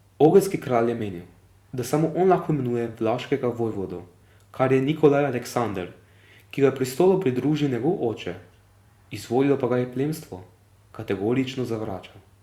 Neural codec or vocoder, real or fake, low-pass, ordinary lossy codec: vocoder, 44.1 kHz, 128 mel bands every 256 samples, BigVGAN v2; fake; 19.8 kHz; Opus, 64 kbps